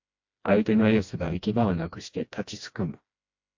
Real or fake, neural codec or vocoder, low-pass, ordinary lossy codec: fake; codec, 16 kHz, 1 kbps, FreqCodec, smaller model; 7.2 kHz; MP3, 48 kbps